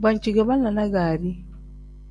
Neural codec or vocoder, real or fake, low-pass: none; real; 9.9 kHz